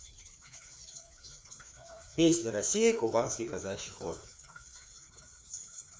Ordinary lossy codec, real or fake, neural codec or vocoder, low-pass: none; fake; codec, 16 kHz, 2 kbps, FreqCodec, larger model; none